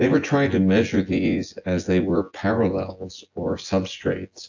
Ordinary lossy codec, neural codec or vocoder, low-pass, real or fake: AAC, 48 kbps; vocoder, 24 kHz, 100 mel bands, Vocos; 7.2 kHz; fake